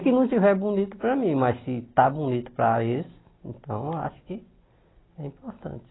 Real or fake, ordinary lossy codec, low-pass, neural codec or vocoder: real; AAC, 16 kbps; 7.2 kHz; none